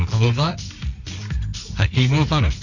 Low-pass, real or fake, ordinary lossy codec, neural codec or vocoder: 7.2 kHz; fake; none; codec, 24 kHz, 0.9 kbps, WavTokenizer, medium music audio release